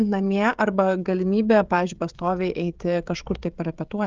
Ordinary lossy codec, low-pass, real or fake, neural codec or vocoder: Opus, 24 kbps; 7.2 kHz; fake; codec, 16 kHz, 16 kbps, FreqCodec, smaller model